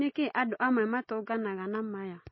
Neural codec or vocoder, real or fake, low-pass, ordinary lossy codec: none; real; 7.2 kHz; MP3, 24 kbps